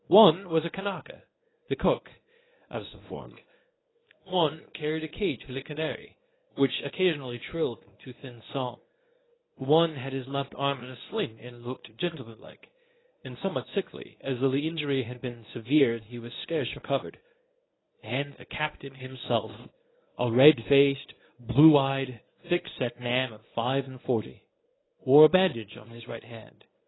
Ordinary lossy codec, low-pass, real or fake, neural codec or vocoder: AAC, 16 kbps; 7.2 kHz; fake; codec, 24 kHz, 0.9 kbps, WavTokenizer, medium speech release version 1